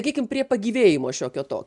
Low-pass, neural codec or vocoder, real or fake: 10.8 kHz; none; real